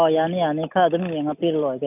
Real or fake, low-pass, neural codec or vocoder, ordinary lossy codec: real; 3.6 kHz; none; none